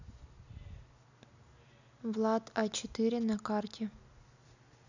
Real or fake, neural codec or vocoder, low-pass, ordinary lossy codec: real; none; 7.2 kHz; none